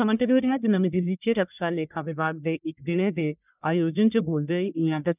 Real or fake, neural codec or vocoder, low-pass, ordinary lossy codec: fake; codec, 44.1 kHz, 1.7 kbps, Pupu-Codec; 3.6 kHz; none